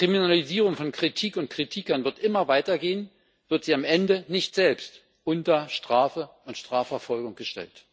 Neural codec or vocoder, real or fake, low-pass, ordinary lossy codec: none; real; none; none